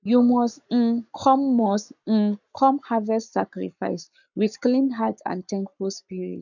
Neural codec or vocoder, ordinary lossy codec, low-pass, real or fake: codec, 44.1 kHz, 7.8 kbps, Pupu-Codec; none; 7.2 kHz; fake